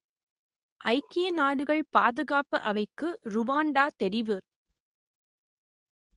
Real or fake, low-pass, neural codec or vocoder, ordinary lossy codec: fake; 10.8 kHz; codec, 24 kHz, 0.9 kbps, WavTokenizer, medium speech release version 2; none